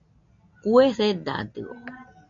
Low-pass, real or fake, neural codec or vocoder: 7.2 kHz; real; none